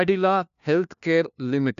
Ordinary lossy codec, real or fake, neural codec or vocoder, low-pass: none; fake; codec, 16 kHz, 1 kbps, FunCodec, trained on LibriTTS, 50 frames a second; 7.2 kHz